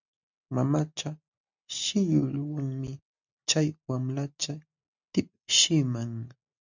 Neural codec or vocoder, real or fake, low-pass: none; real; 7.2 kHz